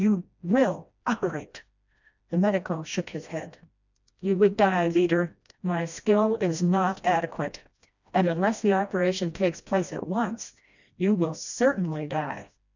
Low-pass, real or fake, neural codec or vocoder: 7.2 kHz; fake; codec, 16 kHz, 1 kbps, FreqCodec, smaller model